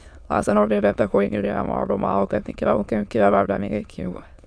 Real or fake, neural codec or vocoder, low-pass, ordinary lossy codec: fake; autoencoder, 22.05 kHz, a latent of 192 numbers a frame, VITS, trained on many speakers; none; none